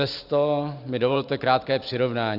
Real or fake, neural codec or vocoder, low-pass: fake; vocoder, 44.1 kHz, 128 mel bands every 512 samples, BigVGAN v2; 5.4 kHz